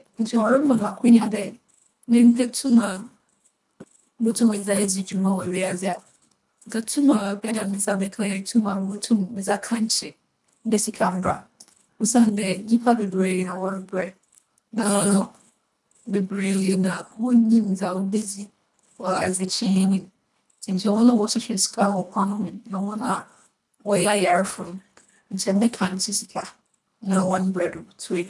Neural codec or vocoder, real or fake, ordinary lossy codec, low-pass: codec, 24 kHz, 1.5 kbps, HILCodec; fake; none; none